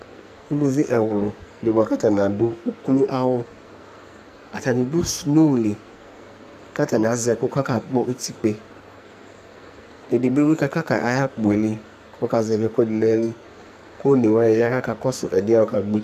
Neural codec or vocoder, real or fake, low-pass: codec, 44.1 kHz, 2.6 kbps, SNAC; fake; 14.4 kHz